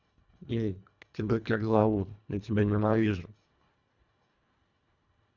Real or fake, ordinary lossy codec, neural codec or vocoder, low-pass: fake; none; codec, 24 kHz, 1.5 kbps, HILCodec; 7.2 kHz